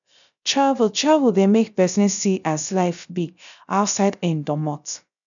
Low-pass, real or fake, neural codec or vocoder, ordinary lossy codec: 7.2 kHz; fake; codec, 16 kHz, 0.3 kbps, FocalCodec; none